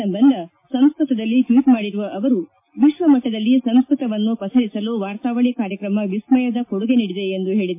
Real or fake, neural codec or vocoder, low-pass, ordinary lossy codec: real; none; 3.6 kHz; MP3, 24 kbps